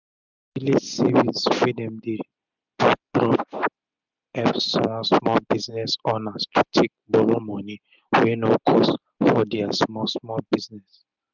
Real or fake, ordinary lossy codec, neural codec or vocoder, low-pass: real; none; none; 7.2 kHz